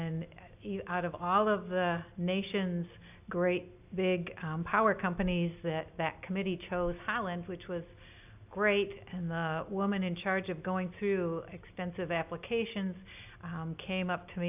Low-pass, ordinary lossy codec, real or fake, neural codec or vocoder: 3.6 kHz; AAC, 32 kbps; real; none